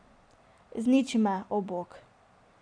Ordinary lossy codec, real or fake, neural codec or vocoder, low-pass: none; real; none; 9.9 kHz